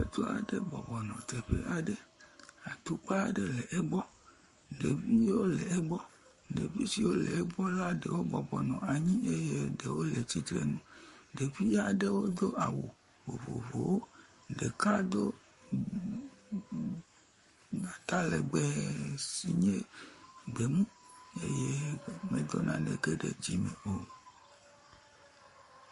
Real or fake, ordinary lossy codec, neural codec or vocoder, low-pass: fake; MP3, 48 kbps; codec, 44.1 kHz, 7.8 kbps, Pupu-Codec; 14.4 kHz